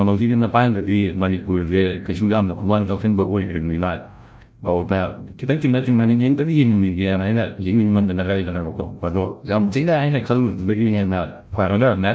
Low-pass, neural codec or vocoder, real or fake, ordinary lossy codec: none; codec, 16 kHz, 0.5 kbps, FreqCodec, larger model; fake; none